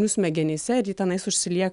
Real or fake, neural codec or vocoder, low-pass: real; none; 10.8 kHz